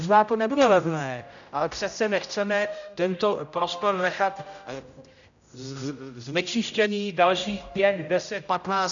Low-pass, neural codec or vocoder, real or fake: 7.2 kHz; codec, 16 kHz, 0.5 kbps, X-Codec, HuBERT features, trained on general audio; fake